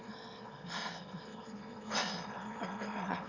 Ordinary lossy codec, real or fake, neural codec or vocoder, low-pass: none; fake; autoencoder, 22.05 kHz, a latent of 192 numbers a frame, VITS, trained on one speaker; 7.2 kHz